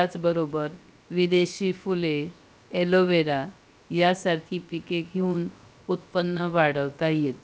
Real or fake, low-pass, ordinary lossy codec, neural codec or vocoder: fake; none; none; codec, 16 kHz, 0.7 kbps, FocalCodec